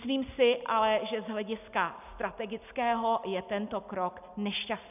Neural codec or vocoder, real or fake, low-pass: none; real; 3.6 kHz